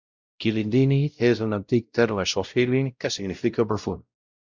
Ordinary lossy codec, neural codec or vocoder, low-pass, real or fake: Opus, 64 kbps; codec, 16 kHz, 0.5 kbps, X-Codec, WavLM features, trained on Multilingual LibriSpeech; 7.2 kHz; fake